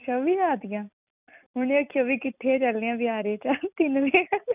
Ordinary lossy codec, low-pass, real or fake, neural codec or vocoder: none; 3.6 kHz; real; none